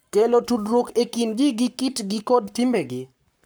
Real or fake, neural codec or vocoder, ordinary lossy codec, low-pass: fake; codec, 44.1 kHz, 7.8 kbps, Pupu-Codec; none; none